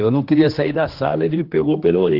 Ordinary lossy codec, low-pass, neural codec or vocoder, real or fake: Opus, 16 kbps; 5.4 kHz; codec, 16 kHz, 2 kbps, X-Codec, HuBERT features, trained on general audio; fake